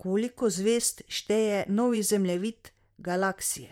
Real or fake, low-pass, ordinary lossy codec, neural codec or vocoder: fake; 19.8 kHz; MP3, 96 kbps; vocoder, 44.1 kHz, 128 mel bands, Pupu-Vocoder